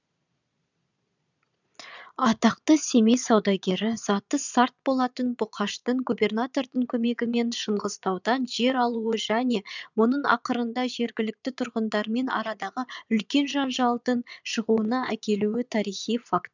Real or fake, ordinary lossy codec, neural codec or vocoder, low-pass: fake; none; vocoder, 22.05 kHz, 80 mel bands, Vocos; 7.2 kHz